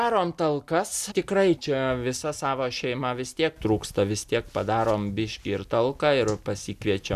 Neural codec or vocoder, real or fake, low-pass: none; real; 14.4 kHz